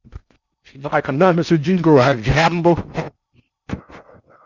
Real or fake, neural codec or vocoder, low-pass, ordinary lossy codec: fake; codec, 16 kHz in and 24 kHz out, 0.6 kbps, FocalCodec, streaming, 4096 codes; 7.2 kHz; Opus, 64 kbps